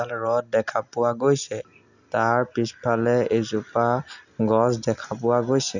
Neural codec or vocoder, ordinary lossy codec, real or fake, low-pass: none; none; real; 7.2 kHz